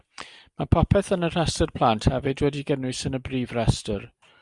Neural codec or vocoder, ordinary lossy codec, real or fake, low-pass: none; Opus, 64 kbps; real; 10.8 kHz